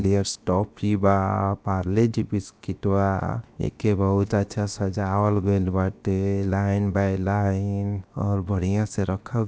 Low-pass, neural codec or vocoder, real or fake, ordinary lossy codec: none; codec, 16 kHz, 0.7 kbps, FocalCodec; fake; none